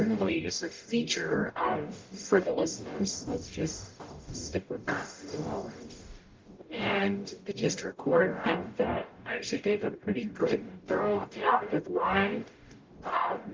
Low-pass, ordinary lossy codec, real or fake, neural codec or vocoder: 7.2 kHz; Opus, 24 kbps; fake; codec, 44.1 kHz, 0.9 kbps, DAC